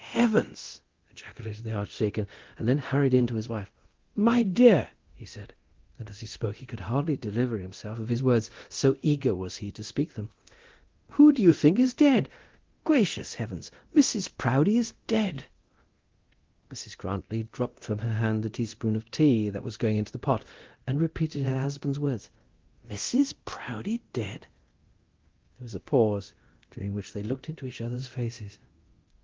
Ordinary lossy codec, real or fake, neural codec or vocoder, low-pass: Opus, 16 kbps; fake; codec, 24 kHz, 0.9 kbps, DualCodec; 7.2 kHz